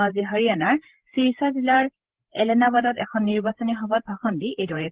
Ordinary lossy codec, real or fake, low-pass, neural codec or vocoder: Opus, 16 kbps; fake; 3.6 kHz; codec, 16 kHz, 8 kbps, FreqCodec, larger model